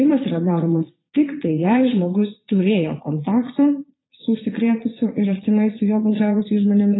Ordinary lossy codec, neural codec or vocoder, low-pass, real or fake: AAC, 16 kbps; vocoder, 22.05 kHz, 80 mel bands, Vocos; 7.2 kHz; fake